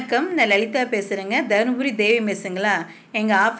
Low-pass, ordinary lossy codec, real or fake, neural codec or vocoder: none; none; real; none